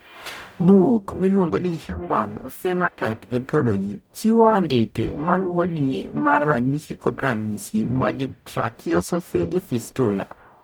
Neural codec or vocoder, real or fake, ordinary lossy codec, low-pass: codec, 44.1 kHz, 0.9 kbps, DAC; fake; none; none